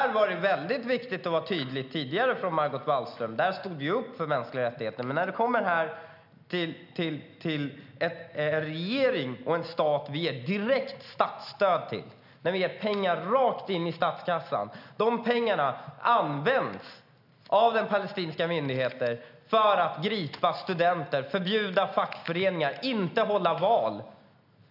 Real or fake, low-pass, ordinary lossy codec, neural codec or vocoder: real; 5.4 kHz; none; none